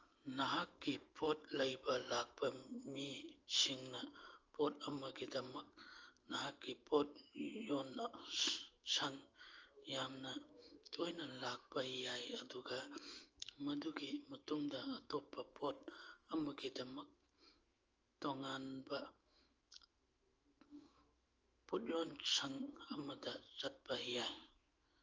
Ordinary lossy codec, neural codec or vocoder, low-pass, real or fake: Opus, 24 kbps; autoencoder, 48 kHz, 128 numbers a frame, DAC-VAE, trained on Japanese speech; 7.2 kHz; fake